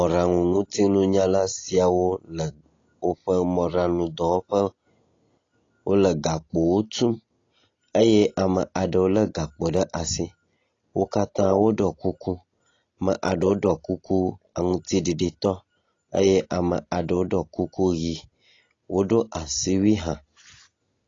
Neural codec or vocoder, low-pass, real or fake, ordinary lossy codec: none; 7.2 kHz; real; AAC, 32 kbps